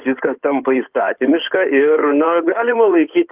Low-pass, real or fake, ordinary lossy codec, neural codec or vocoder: 3.6 kHz; fake; Opus, 32 kbps; codec, 16 kHz in and 24 kHz out, 2.2 kbps, FireRedTTS-2 codec